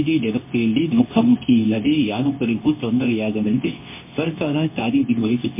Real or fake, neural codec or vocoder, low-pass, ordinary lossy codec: fake; codec, 24 kHz, 0.9 kbps, WavTokenizer, medium speech release version 2; 3.6 kHz; MP3, 24 kbps